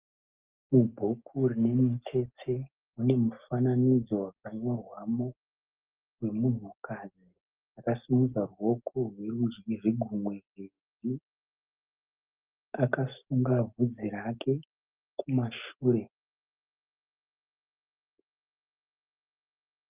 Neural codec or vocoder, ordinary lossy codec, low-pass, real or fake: none; Opus, 24 kbps; 3.6 kHz; real